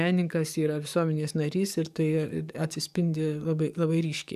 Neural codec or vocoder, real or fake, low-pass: codec, 44.1 kHz, 7.8 kbps, DAC; fake; 14.4 kHz